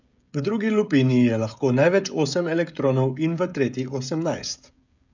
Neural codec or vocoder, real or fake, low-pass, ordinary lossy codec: codec, 16 kHz, 16 kbps, FreqCodec, smaller model; fake; 7.2 kHz; none